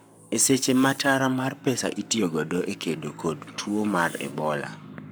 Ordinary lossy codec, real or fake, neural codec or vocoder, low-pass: none; fake; codec, 44.1 kHz, 7.8 kbps, Pupu-Codec; none